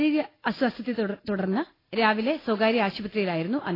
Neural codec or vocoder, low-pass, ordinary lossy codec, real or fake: none; 5.4 kHz; AAC, 24 kbps; real